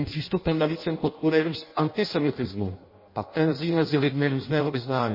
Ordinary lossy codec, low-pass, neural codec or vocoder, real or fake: MP3, 24 kbps; 5.4 kHz; codec, 16 kHz in and 24 kHz out, 0.6 kbps, FireRedTTS-2 codec; fake